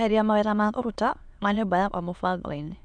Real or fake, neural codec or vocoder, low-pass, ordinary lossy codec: fake; autoencoder, 22.05 kHz, a latent of 192 numbers a frame, VITS, trained on many speakers; 9.9 kHz; MP3, 96 kbps